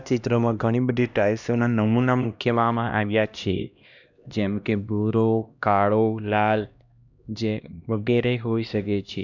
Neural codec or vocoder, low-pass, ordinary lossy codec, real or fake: codec, 16 kHz, 1 kbps, X-Codec, HuBERT features, trained on LibriSpeech; 7.2 kHz; none; fake